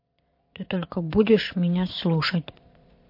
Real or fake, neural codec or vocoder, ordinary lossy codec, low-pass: fake; vocoder, 22.05 kHz, 80 mel bands, Vocos; MP3, 32 kbps; 5.4 kHz